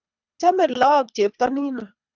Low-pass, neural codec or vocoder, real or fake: 7.2 kHz; codec, 24 kHz, 3 kbps, HILCodec; fake